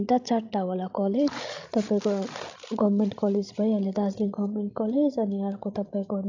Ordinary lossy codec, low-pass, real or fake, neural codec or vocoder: AAC, 48 kbps; 7.2 kHz; fake; codec, 16 kHz, 16 kbps, FreqCodec, larger model